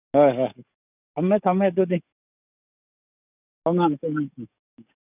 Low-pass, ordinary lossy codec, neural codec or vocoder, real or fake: 3.6 kHz; none; codec, 44.1 kHz, 7.8 kbps, DAC; fake